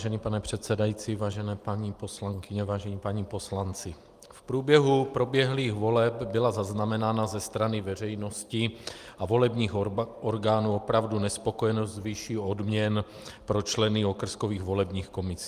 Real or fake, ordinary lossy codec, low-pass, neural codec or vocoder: real; Opus, 32 kbps; 14.4 kHz; none